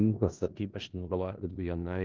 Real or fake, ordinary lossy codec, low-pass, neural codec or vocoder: fake; Opus, 24 kbps; 7.2 kHz; codec, 16 kHz in and 24 kHz out, 0.4 kbps, LongCat-Audio-Codec, four codebook decoder